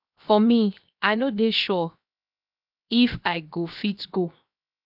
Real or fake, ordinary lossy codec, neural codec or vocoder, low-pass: fake; none; codec, 16 kHz, 0.7 kbps, FocalCodec; 5.4 kHz